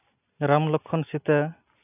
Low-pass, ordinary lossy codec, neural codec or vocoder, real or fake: 3.6 kHz; none; none; real